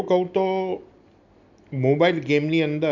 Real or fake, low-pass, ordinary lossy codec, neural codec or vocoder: real; 7.2 kHz; none; none